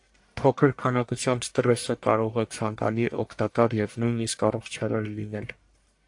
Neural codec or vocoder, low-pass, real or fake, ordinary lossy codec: codec, 44.1 kHz, 1.7 kbps, Pupu-Codec; 10.8 kHz; fake; AAC, 64 kbps